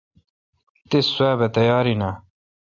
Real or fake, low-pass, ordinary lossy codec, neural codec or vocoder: real; 7.2 kHz; AAC, 48 kbps; none